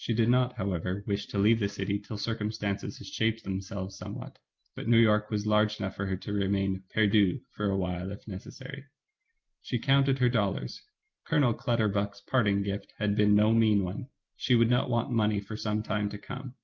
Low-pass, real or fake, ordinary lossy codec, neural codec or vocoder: 7.2 kHz; real; Opus, 32 kbps; none